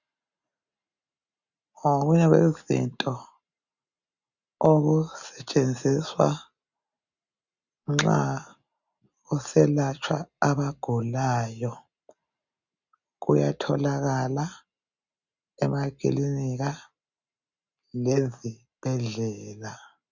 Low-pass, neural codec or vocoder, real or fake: 7.2 kHz; none; real